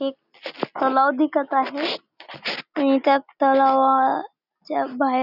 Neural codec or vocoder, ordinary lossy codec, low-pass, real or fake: none; none; 5.4 kHz; real